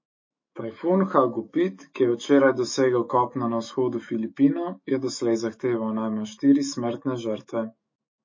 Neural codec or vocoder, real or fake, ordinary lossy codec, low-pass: autoencoder, 48 kHz, 128 numbers a frame, DAC-VAE, trained on Japanese speech; fake; MP3, 32 kbps; 7.2 kHz